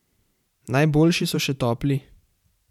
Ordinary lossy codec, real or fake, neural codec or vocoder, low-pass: none; fake; vocoder, 44.1 kHz, 128 mel bands every 512 samples, BigVGAN v2; 19.8 kHz